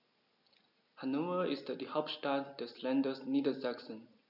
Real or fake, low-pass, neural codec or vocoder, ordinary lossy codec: real; 5.4 kHz; none; none